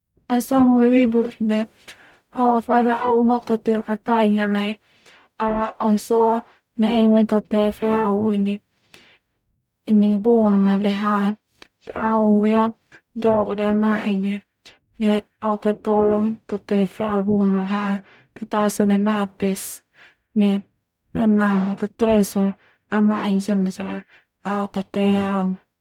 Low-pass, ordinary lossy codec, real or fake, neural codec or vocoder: 19.8 kHz; none; fake; codec, 44.1 kHz, 0.9 kbps, DAC